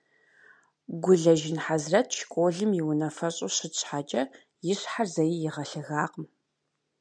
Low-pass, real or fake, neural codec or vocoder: 9.9 kHz; real; none